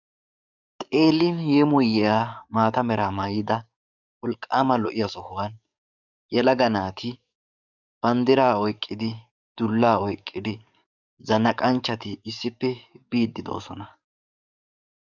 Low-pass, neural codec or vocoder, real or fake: 7.2 kHz; codec, 44.1 kHz, 7.8 kbps, DAC; fake